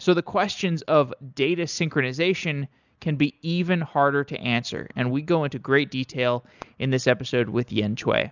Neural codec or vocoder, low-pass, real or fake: none; 7.2 kHz; real